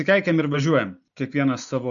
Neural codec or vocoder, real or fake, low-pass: none; real; 7.2 kHz